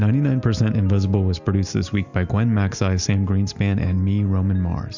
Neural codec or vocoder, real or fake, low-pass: none; real; 7.2 kHz